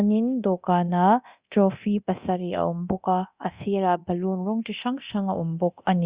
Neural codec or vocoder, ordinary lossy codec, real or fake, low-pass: codec, 24 kHz, 0.9 kbps, DualCodec; Opus, 64 kbps; fake; 3.6 kHz